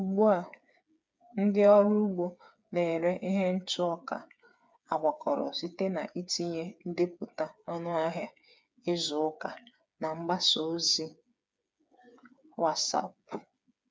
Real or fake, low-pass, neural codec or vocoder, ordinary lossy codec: fake; none; codec, 16 kHz, 8 kbps, FreqCodec, smaller model; none